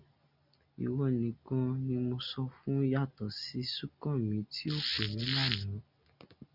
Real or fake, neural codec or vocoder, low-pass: real; none; 5.4 kHz